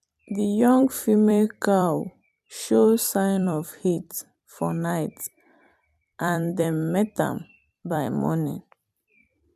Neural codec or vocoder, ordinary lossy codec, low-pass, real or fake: vocoder, 44.1 kHz, 128 mel bands every 256 samples, BigVGAN v2; none; 14.4 kHz; fake